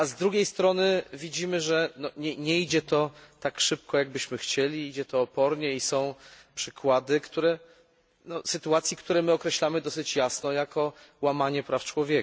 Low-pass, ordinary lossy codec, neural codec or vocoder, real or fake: none; none; none; real